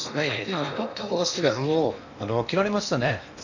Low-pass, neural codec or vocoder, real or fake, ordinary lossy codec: 7.2 kHz; codec, 16 kHz in and 24 kHz out, 0.8 kbps, FocalCodec, streaming, 65536 codes; fake; none